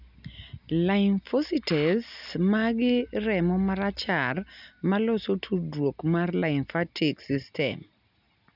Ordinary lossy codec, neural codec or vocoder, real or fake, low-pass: none; none; real; 5.4 kHz